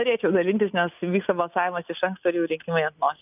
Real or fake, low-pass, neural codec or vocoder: real; 3.6 kHz; none